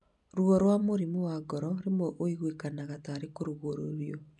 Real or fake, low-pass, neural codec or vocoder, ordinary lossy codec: real; 10.8 kHz; none; none